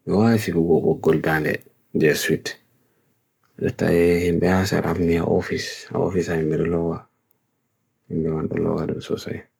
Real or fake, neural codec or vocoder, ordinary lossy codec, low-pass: fake; codec, 44.1 kHz, 7.8 kbps, Pupu-Codec; none; none